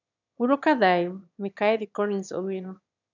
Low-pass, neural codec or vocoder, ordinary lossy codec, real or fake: 7.2 kHz; autoencoder, 22.05 kHz, a latent of 192 numbers a frame, VITS, trained on one speaker; none; fake